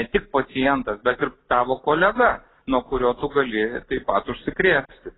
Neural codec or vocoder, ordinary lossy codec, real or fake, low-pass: none; AAC, 16 kbps; real; 7.2 kHz